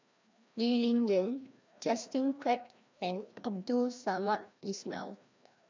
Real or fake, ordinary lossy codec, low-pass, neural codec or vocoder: fake; MP3, 64 kbps; 7.2 kHz; codec, 16 kHz, 1 kbps, FreqCodec, larger model